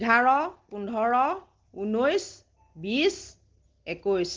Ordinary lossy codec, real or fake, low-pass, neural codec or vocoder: Opus, 16 kbps; real; 7.2 kHz; none